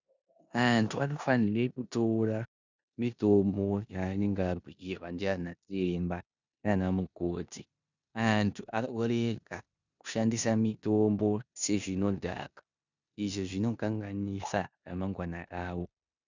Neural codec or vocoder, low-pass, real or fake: codec, 16 kHz in and 24 kHz out, 0.9 kbps, LongCat-Audio-Codec, four codebook decoder; 7.2 kHz; fake